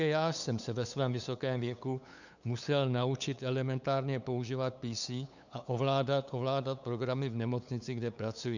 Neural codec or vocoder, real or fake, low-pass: codec, 16 kHz, 8 kbps, FunCodec, trained on LibriTTS, 25 frames a second; fake; 7.2 kHz